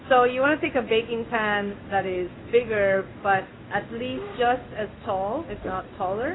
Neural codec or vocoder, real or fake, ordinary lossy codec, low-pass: codec, 16 kHz in and 24 kHz out, 1 kbps, XY-Tokenizer; fake; AAC, 16 kbps; 7.2 kHz